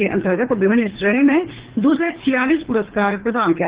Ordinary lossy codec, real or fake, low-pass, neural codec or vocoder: Opus, 16 kbps; fake; 3.6 kHz; codec, 24 kHz, 3 kbps, HILCodec